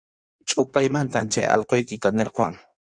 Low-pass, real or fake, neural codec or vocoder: 9.9 kHz; fake; codec, 16 kHz in and 24 kHz out, 1.1 kbps, FireRedTTS-2 codec